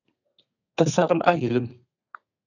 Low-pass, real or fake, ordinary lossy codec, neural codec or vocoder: 7.2 kHz; fake; AAC, 48 kbps; codec, 32 kHz, 1.9 kbps, SNAC